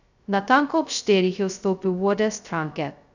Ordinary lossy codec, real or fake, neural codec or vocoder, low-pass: none; fake; codec, 16 kHz, 0.2 kbps, FocalCodec; 7.2 kHz